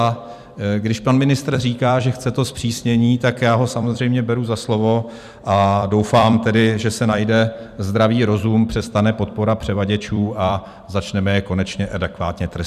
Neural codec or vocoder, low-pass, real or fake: vocoder, 44.1 kHz, 128 mel bands every 256 samples, BigVGAN v2; 14.4 kHz; fake